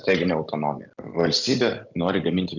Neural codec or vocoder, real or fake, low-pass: none; real; 7.2 kHz